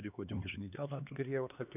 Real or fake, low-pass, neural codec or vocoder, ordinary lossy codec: fake; 3.6 kHz; codec, 16 kHz, 2 kbps, X-Codec, HuBERT features, trained on LibriSpeech; none